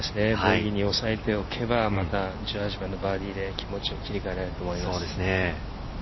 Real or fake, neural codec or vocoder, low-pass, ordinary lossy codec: real; none; 7.2 kHz; MP3, 24 kbps